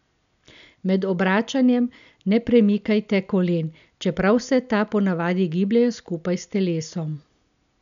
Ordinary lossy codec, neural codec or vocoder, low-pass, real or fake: none; none; 7.2 kHz; real